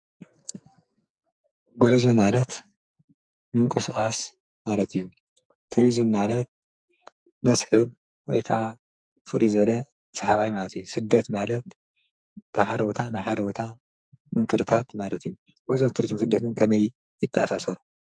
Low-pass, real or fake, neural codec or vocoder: 9.9 kHz; fake; codec, 44.1 kHz, 2.6 kbps, SNAC